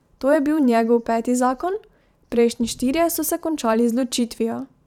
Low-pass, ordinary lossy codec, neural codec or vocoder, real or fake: 19.8 kHz; none; vocoder, 44.1 kHz, 128 mel bands every 512 samples, BigVGAN v2; fake